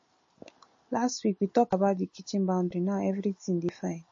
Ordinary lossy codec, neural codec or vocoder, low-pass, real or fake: MP3, 32 kbps; none; 7.2 kHz; real